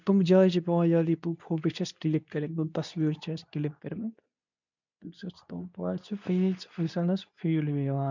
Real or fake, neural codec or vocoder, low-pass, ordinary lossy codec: fake; codec, 24 kHz, 0.9 kbps, WavTokenizer, medium speech release version 2; 7.2 kHz; none